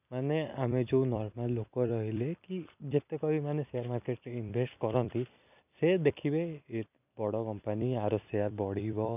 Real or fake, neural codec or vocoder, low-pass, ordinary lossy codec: fake; vocoder, 44.1 kHz, 128 mel bands every 256 samples, BigVGAN v2; 3.6 kHz; none